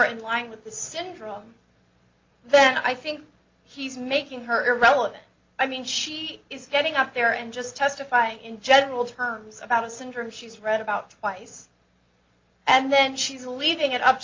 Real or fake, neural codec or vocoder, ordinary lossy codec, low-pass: real; none; Opus, 24 kbps; 7.2 kHz